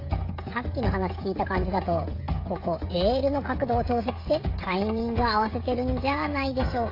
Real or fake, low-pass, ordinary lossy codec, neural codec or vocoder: fake; 5.4 kHz; AAC, 32 kbps; codec, 16 kHz, 16 kbps, FreqCodec, smaller model